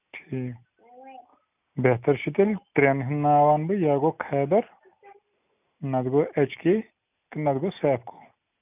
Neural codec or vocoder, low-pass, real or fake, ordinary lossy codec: none; 3.6 kHz; real; none